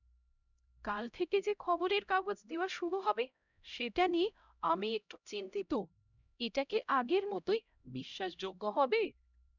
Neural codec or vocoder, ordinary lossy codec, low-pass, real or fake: codec, 16 kHz, 0.5 kbps, X-Codec, HuBERT features, trained on LibriSpeech; none; 7.2 kHz; fake